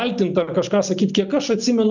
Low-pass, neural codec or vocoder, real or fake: 7.2 kHz; none; real